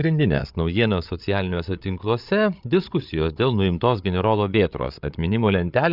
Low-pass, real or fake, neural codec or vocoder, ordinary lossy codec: 5.4 kHz; fake; codec, 16 kHz, 8 kbps, FreqCodec, larger model; Opus, 64 kbps